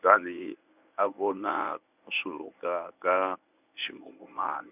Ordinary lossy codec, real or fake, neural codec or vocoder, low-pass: none; fake; codec, 24 kHz, 0.9 kbps, WavTokenizer, medium speech release version 1; 3.6 kHz